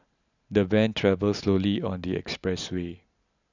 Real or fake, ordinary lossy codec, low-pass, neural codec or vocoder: real; none; 7.2 kHz; none